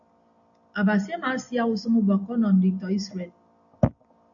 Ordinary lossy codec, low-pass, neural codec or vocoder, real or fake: AAC, 64 kbps; 7.2 kHz; none; real